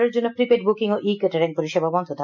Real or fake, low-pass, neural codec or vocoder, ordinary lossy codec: real; 7.2 kHz; none; none